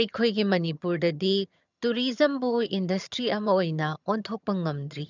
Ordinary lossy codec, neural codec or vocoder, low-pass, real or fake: none; vocoder, 22.05 kHz, 80 mel bands, HiFi-GAN; 7.2 kHz; fake